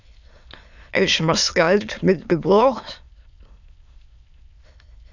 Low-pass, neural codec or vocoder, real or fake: 7.2 kHz; autoencoder, 22.05 kHz, a latent of 192 numbers a frame, VITS, trained on many speakers; fake